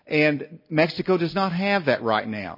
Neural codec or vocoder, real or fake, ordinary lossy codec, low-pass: none; real; MP3, 24 kbps; 5.4 kHz